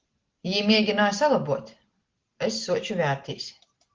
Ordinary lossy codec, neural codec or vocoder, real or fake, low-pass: Opus, 16 kbps; none; real; 7.2 kHz